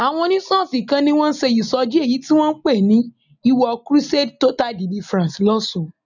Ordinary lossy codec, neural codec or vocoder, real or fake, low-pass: none; none; real; 7.2 kHz